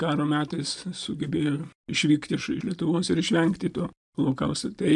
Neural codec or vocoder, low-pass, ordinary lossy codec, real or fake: none; 10.8 kHz; MP3, 96 kbps; real